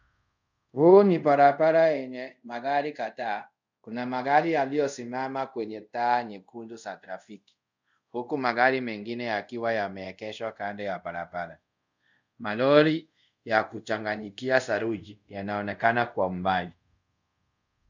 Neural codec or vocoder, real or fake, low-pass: codec, 24 kHz, 0.5 kbps, DualCodec; fake; 7.2 kHz